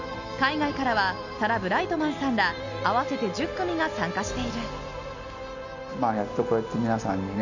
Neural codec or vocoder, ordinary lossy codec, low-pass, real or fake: none; none; 7.2 kHz; real